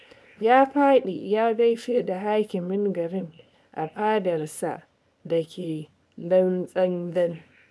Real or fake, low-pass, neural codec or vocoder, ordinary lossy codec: fake; none; codec, 24 kHz, 0.9 kbps, WavTokenizer, small release; none